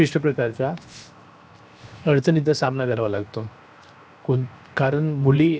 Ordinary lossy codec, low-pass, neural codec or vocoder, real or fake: none; none; codec, 16 kHz, 0.7 kbps, FocalCodec; fake